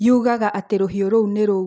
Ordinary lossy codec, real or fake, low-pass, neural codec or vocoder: none; real; none; none